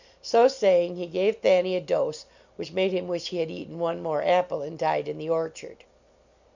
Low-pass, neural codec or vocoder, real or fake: 7.2 kHz; none; real